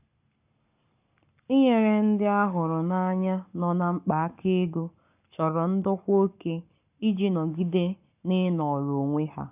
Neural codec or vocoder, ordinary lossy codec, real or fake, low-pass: codec, 44.1 kHz, 7.8 kbps, Pupu-Codec; none; fake; 3.6 kHz